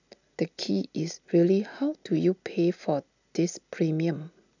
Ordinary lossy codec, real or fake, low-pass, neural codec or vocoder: none; real; 7.2 kHz; none